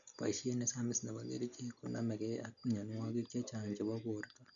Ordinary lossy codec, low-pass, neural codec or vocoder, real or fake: none; 7.2 kHz; none; real